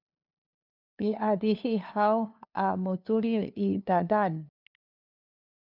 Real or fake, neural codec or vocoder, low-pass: fake; codec, 16 kHz, 2 kbps, FunCodec, trained on LibriTTS, 25 frames a second; 5.4 kHz